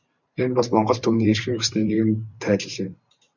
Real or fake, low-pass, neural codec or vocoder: fake; 7.2 kHz; vocoder, 44.1 kHz, 128 mel bands every 512 samples, BigVGAN v2